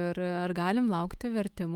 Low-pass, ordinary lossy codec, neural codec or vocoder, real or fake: 19.8 kHz; Opus, 32 kbps; autoencoder, 48 kHz, 128 numbers a frame, DAC-VAE, trained on Japanese speech; fake